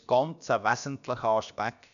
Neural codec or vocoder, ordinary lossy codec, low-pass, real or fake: codec, 16 kHz, about 1 kbps, DyCAST, with the encoder's durations; none; 7.2 kHz; fake